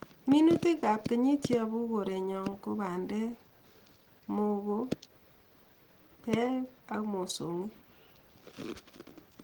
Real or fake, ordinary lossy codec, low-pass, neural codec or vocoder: real; Opus, 16 kbps; 19.8 kHz; none